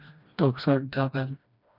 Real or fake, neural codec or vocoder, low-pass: fake; codec, 16 kHz, 1 kbps, FreqCodec, smaller model; 5.4 kHz